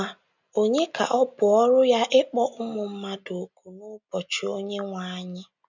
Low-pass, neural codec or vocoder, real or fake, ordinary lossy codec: 7.2 kHz; none; real; none